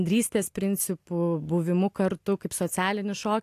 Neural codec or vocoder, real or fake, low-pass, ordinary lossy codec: none; real; 14.4 kHz; AAC, 64 kbps